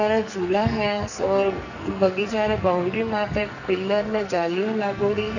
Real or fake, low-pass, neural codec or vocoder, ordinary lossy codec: fake; 7.2 kHz; codec, 44.1 kHz, 2.6 kbps, SNAC; none